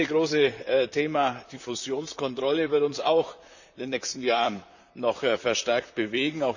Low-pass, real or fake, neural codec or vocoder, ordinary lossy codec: 7.2 kHz; fake; vocoder, 44.1 kHz, 128 mel bands, Pupu-Vocoder; none